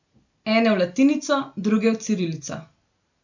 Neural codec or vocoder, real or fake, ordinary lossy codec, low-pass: none; real; none; 7.2 kHz